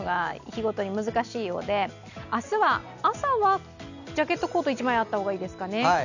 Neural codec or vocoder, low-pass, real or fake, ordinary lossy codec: none; 7.2 kHz; real; none